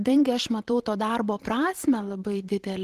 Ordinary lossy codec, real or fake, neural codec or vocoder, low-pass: Opus, 16 kbps; fake; vocoder, 44.1 kHz, 128 mel bands every 512 samples, BigVGAN v2; 14.4 kHz